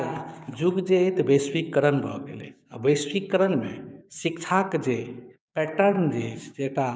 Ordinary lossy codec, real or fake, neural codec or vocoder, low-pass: none; fake; codec, 16 kHz, 6 kbps, DAC; none